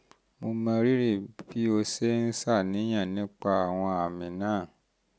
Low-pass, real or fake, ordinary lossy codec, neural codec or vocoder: none; real; none; none